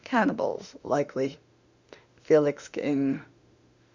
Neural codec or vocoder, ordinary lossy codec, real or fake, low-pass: autoencoder, 48 kHz, 32 numbers a frame, DAC-VAE, trained on Japanese speech; Opus, 64 kbps; fake; 7.2 kHz